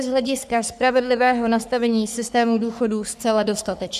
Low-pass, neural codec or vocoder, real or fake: 14.4 kHz; codec, 44.1 kHz, 3.4 kbps, Pupu-Codec; fake